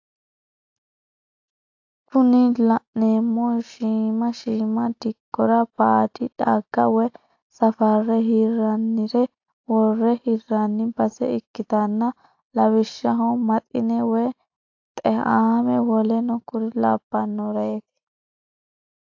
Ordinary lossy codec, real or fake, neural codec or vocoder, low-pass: AAC, 48 kbps; real; none; 7.2 kHz